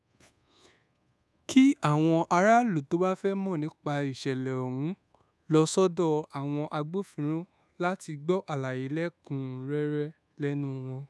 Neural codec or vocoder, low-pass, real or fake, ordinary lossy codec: codec, 24 kHz, 1.2 kbps, DualCodec; none; fake; none